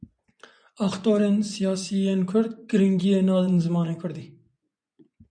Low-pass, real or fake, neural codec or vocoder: 9.9 kHz; fake; vocoder, 24 kHz, 100 mel bands, Vocos